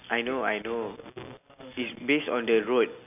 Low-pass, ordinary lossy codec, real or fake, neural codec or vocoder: 3.6 kHz; none; real; none